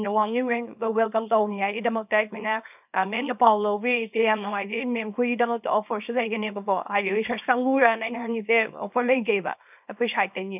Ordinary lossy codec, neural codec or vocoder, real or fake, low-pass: none; codec, 24 kHz, 0.9 kbps, WavTokenizer, small release; fake; 3.6 kHz